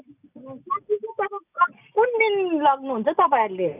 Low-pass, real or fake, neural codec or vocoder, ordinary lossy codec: 3.6 kHz; real; none; none